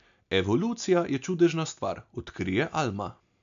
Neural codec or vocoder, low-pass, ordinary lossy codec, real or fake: none; 7.2 kHz; MP3, 64 kbps; real